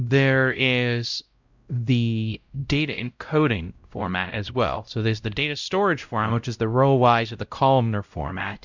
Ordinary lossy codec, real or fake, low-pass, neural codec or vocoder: Opus, 64 kbps; fake; 7.2 kHz; codec, 16 kHz, 0.5 kbps, X-Codec, WavLM features, trained on Multilingual LibriSpeech